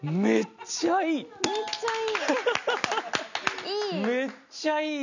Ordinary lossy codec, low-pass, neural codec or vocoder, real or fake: none; 7.2 kHz; none; real